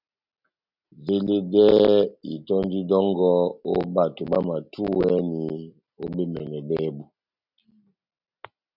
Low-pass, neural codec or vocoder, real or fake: 5.4 kHz; none; real